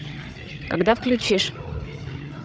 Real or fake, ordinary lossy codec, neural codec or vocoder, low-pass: fake; none; codec, 16 kHz, 16 kbps, FreqCodec, larger model; none